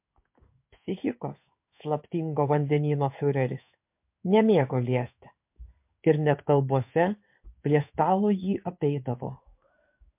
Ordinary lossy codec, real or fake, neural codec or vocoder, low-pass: MP3, 32 kbps; fake; codec, 16 kHz in and 24 kHz out, 1 kbps, XY-Tokenizer; 3.6 kHz